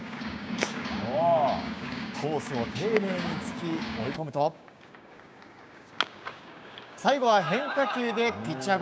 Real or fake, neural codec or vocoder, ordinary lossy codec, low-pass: fake; codec, 16 kHz, 6 kbps, DAC; none; none